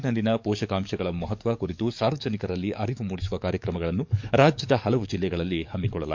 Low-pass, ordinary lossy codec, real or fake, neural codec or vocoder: 7.2 kHz; MP3, 64 kbps; fake; codec, 44.1 kHz, 7.8 kbps, DAC